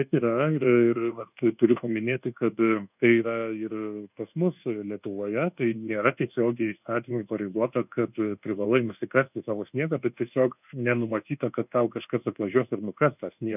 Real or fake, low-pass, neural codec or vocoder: fake; 3.6 kHz; codec, 24 kHz, 1.2 kbps, DualCodec